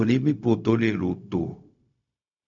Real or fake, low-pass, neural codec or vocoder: fake; 7.2 kHz; codec, 16 kHz, 0.4 kbps, LongCat-Audio-Codec